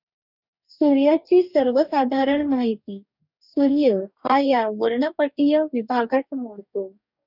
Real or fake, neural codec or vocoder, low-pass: fake; codec, 44.1 kHz, 2.6 kbps, DAC; 5.4 kHz